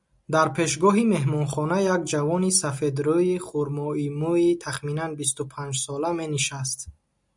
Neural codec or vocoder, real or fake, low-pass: none; real; 10.8 kHz